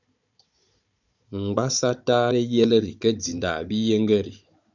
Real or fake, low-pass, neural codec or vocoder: fake; 7.2 kHz; codec, 16 kHz, 16 kbps, FunCodec, trained on Chinese and English, 50 frames a second